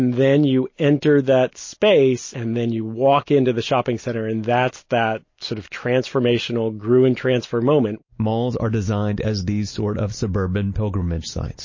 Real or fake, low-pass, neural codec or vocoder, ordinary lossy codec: real; 7.2 kHz; none; MP3, 32 kbps